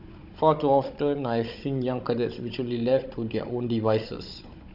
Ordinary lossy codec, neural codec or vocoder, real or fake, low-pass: none; codec, 16 kHz, 16 kbps, FunCodec, trained on Chinese and English, 50 frames a second; fake; 5.4 kHz